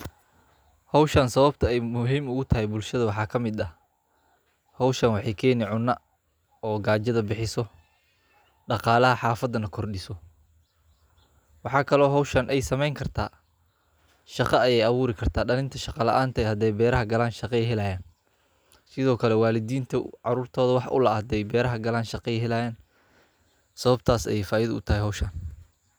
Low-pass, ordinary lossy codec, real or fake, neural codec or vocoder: none; none; real; none